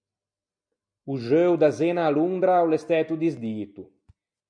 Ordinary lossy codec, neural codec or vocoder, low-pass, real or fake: MP3, 96 kbps; none; 9.9 kHz; real